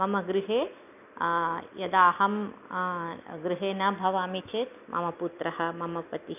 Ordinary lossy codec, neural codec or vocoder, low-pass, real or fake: none; none; 3.6 kHz; real